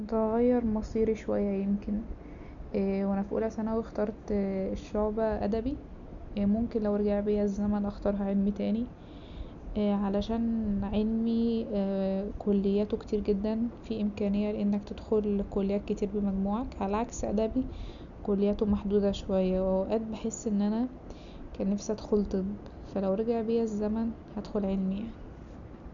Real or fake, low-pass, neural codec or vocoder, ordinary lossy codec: real; 7.2 kHz; none; none